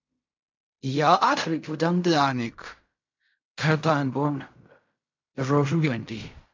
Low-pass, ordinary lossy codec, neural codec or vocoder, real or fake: 7.2 kHz; MP3, 64 kbps; codec, 16 kHz in and 24 kHz out, 0.4 kbps, LongCat-Audio-Codec, fine tuned four codebook decoder; fake